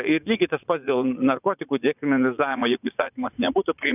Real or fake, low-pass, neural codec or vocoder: fake; 3.6 kHz; vocoder, 44.1 kHz, 80 mel bands, Vocos